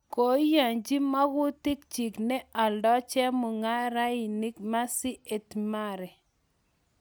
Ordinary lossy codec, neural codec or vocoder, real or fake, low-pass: none; none; real; none